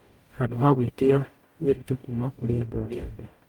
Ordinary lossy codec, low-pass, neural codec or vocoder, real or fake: Opus, 32 kbps; 19.8 kHz; codec, 44.1 kHz, 0.9 kbps, DAC; fake